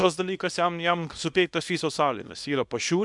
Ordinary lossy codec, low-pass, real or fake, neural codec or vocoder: MP3, 96 kbps; 10.8 kHz; fake; codec, 24 kHz, 0.9 kbps, WavTokenizer, small release